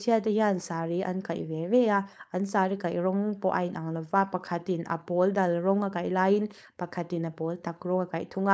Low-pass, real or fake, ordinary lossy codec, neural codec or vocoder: none; fake; none; codec, 16 kHz, 4.8 kbps, FACodec